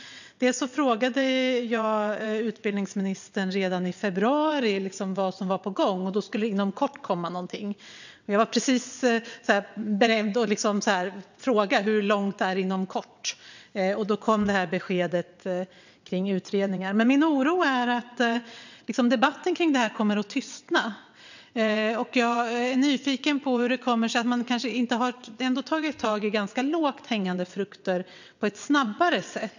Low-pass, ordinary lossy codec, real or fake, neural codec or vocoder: 7.2 kHz; none; fake; vocoder, 22.05 kHz, 80 mel bands, WaveNeXt